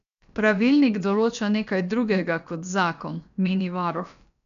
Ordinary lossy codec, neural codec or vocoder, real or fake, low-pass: none; codec, 16 kHz, about 1 kbps, DyCAST, with the encoder's durations; fake; 7.2 kHz